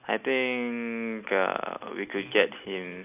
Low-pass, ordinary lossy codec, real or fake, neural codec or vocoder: 3.6 kHz; AAC, 32 kbps; real; none